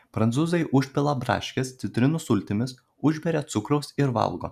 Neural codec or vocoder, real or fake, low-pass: none; real; 14.4 kHz